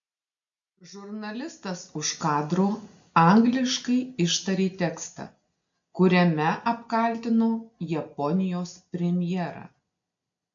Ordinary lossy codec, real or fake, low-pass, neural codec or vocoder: MP3, 64 kbps; real; 7.2 kHz; none